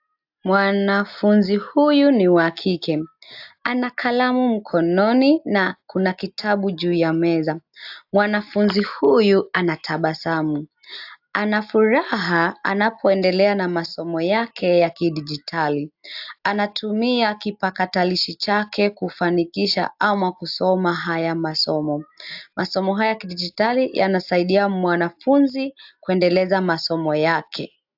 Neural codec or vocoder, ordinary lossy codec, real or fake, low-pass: none; AAC, 48 kbps; real; 5.4 kHz